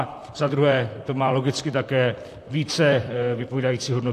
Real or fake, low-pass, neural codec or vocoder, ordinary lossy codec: fake; 14.4 kHz; vocoder, 44.1 kHz, 128 mel bands, Pupu-Vocoder; AAC, 64 kbps